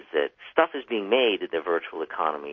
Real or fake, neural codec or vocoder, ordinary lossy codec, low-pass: real; none; MP3, 32 kbps; 7.2 kHz